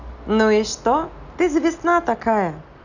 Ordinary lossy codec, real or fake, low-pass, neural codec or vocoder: none; real; 7.2 kHz; none